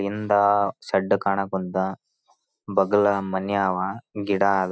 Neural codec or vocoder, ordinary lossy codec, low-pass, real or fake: none; none; none; real